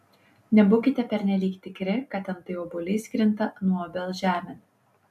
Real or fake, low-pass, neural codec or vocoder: real; 14.4 kHz; none